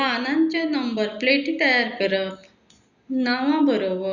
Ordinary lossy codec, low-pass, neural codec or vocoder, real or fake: Opus, 64 kbps; 7.2 kHz; none; real